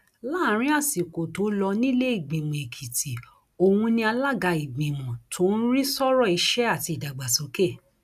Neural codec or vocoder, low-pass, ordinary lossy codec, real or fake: none; 14.4 kHz; none; real